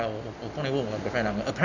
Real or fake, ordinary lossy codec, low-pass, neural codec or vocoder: real; none; 7.2 kHz; none